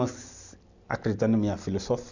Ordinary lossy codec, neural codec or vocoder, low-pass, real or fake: none; none; 7.2 kHz; real